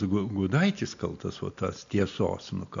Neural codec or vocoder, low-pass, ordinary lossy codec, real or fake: none; 7.2 kHz; MP3, 64 kbps; real